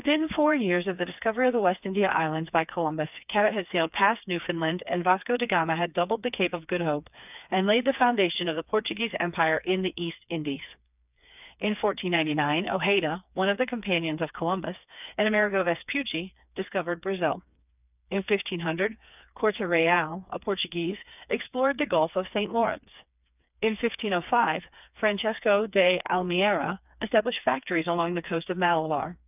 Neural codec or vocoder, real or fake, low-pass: codec, 16 kHz, 4 kbps, FreqCodec, smaller model; fake; 3.6 kHz